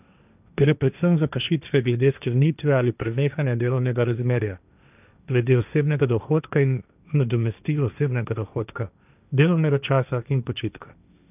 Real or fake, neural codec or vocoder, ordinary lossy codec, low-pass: fake; codec, 16 kHz, 1.1 kbps, Voila-Tokenizer; none; 3.6 kHz